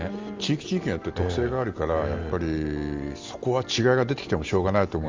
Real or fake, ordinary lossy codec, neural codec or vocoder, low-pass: real; Opus, 32 kbps; none; 7.2 kHz